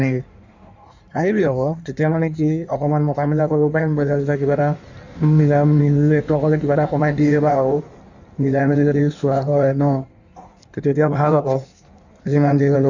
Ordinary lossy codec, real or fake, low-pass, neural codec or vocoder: none; fake; 7.2 kHz; codec, 16 kHz in and 24 kHz out, 1.1 kbps, FireRedTTS-2 codec